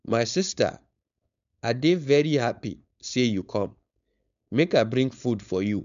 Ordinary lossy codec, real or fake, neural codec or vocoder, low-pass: MP3, 64 kbps; fake; codec, 16 kHz, 4.8 kbps, FACodec; 7.2 kHz